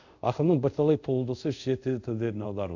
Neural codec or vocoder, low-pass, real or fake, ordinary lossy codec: codec, 24 kHz, 0.5 kbps, DualCodec; 7.2 kHz; fake; none